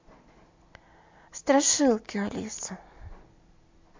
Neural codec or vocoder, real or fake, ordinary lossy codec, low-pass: none; real; MP3, 48 kbps; 7.2 kHz